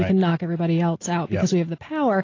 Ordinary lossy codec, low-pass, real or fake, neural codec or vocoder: AAC, 32 kbps; 7.2 kHz; real; none